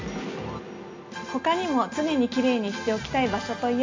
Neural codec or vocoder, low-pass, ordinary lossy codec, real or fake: none; 7.2 kHz; MP3, 64 kbps; real